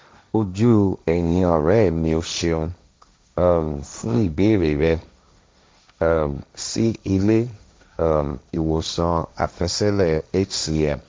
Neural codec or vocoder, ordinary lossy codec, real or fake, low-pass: codec, 16 kHz, 1.1 kbps, Voila-Tokenizer; none; fake; none